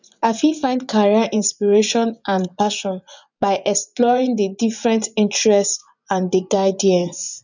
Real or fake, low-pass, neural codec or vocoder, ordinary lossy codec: fake; 7.2 kHz; vocoder, 24 kHz, 100 mel bands, Vocos; none